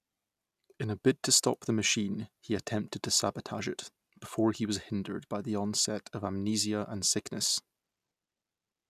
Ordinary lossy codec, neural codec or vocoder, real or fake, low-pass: none; none; real; 14.4 kHz